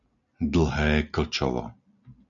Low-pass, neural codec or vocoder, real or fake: 7.2 kHz; none; real